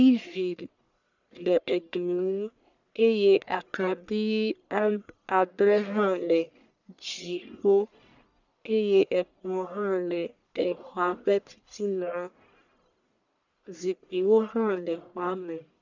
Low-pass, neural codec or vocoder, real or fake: 7.2 kHz; codec, 44.1 kHz, 1.7 kbps, Pupu-Codec; fake